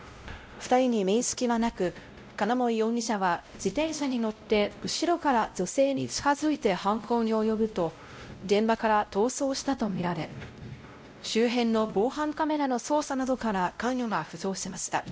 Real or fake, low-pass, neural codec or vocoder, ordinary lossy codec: fake; none; codec, 16 kHz, 0.5 kbps, X-Codec, WavLM features, trained on Multilingual LibriSpeech; none